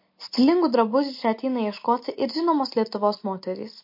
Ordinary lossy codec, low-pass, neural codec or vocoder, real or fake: MP3, 32 kbps; 5.4 kHz; none; real